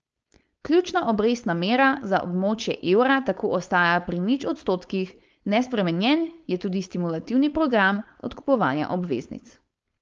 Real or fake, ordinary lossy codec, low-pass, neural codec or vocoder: fake; Opus, 24 kbps; 7.2 kHz; codec, 16 kHz, 4.8 kbps, FACodec